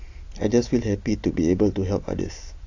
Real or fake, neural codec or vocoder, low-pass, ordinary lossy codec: real; none; 7.2 kHz; AAC, 48 kbps